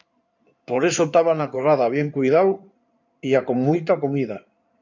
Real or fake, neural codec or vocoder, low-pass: fake; codec, 16 kHz in and 24 kHz out, 2.2 kbps, FireRedTTS-2 codec; 7.2 kHz